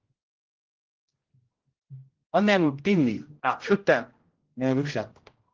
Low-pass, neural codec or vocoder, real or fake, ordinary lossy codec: 7.2 kHz; codec, 16 kHz, 0.5 kbps, X-Codec, HuBERT features, trained on general audio; fake; Opus, 16 kbps